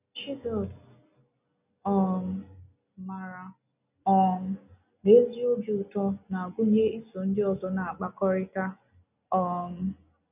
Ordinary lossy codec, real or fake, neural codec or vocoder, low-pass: none; real; none; 3.6 kHz